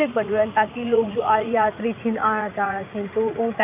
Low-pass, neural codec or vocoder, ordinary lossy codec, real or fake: 3.6 kHz; vocoder, 44.1 kHz, 80 mel bands, Vocos; AAC, 32 kbps; fake